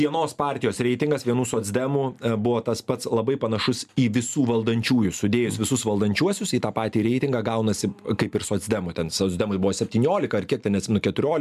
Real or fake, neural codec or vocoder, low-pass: real; none; 14.4 kHz